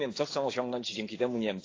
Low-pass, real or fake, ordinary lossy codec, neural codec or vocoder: 7.2 kHz; fake; AAC, 32 kbps; codec, 16 kHz, 6 kbps, DAC